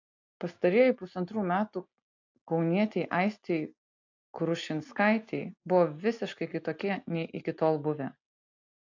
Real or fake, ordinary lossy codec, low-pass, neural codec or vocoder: real; AAC, 48 kbps; 7.2 kHz; none